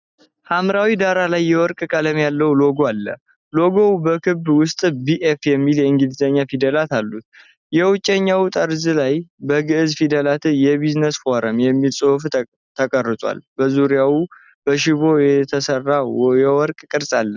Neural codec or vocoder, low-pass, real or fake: none; 7.2 kHz; real